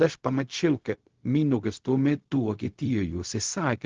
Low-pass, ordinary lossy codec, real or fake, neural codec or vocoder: 7.2 kHz; Opus, 16 kbps; fake; codec, 16 kHz, 0.4 kbps, LongCat-Audio-Codec